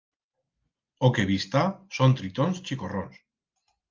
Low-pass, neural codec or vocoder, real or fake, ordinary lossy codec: 7.2 kHz; none; real; Opus, 24 kbps